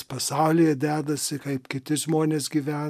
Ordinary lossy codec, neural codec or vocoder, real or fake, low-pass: MP3, 96 kbps; none; real; 14.4 kHz